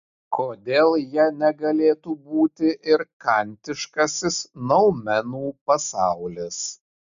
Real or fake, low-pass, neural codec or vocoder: real; 7.2 kHz; none